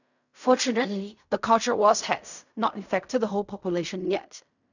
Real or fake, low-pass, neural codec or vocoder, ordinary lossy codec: fake; 7.2 kHz; codec, 16 kHz in and 24 kHz out, 0.4 kbps, LongCat-Audio-Codec, fine tuned four codebook decoder; none